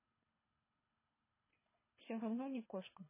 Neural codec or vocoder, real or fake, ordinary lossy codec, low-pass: codec, 24 kHz, 3 kbps, HILCodec; fake; MP3, 16 kbps; 3.6 kHz